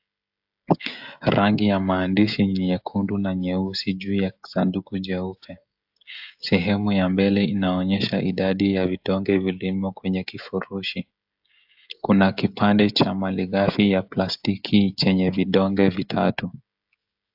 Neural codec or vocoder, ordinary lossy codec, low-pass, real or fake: codec, 16 kHz, 16 kbps, FreqCodec, smaller model; AAC, 48 kbps; 5.4 kHz; fake